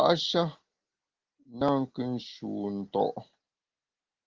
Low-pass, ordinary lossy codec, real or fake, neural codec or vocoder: 7.2 kHz; Opus, 16 kbps; real; none